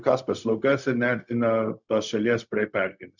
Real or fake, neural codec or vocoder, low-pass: fake; codec, 16 kHz, 0.4 kbps, LongCat-Audio-Codec; 7.2 kHz